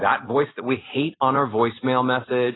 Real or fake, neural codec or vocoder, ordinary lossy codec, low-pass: real; none; AAC, 16 kbps; 7.2 kHz